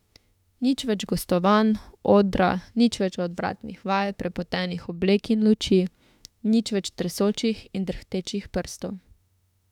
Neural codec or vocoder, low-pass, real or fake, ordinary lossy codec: autoencoder, 48 kHz, 32 numbers a frame, DAC-VAE, trained on Japanese speech; 19.8 kHz; fake; none